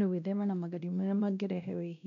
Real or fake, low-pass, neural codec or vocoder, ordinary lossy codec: fake; 7.2 kHz; codec, 16 kHz, 1 kbps, X-Codec, WavLM features, trained on Multilingual LibriSpeech; none